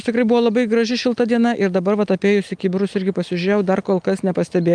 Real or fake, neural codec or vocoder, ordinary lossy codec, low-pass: real; none; Opus, 32 kbps; 9.9 kHz